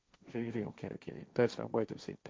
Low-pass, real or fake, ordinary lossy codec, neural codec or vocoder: none; fake; none; codec, 16 kHz, 1.1 kbps, Voila-Tokenizer